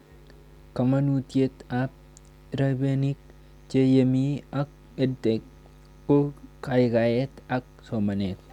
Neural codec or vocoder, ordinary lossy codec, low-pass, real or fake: none; none; 19.8 kHz; real